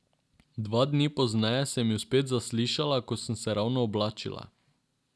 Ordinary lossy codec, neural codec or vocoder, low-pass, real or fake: none; none; none; real